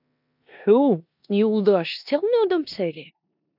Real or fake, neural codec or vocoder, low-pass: fake; codec, 16 kHz in and 24 kHz out, 0.9 kbps, LongCat-Audio-Codec, four codebook decoder; 5.4 kHz